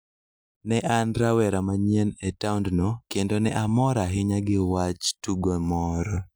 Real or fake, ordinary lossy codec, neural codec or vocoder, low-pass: real; none; none; none